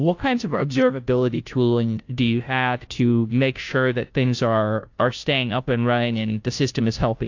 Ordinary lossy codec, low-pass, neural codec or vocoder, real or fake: AAC, 48 kbps; 7.2 kHz; codec, 16 kHz, 0.5 kbps, FunCodec, trained on Chinese and English, 25 frames a second; fake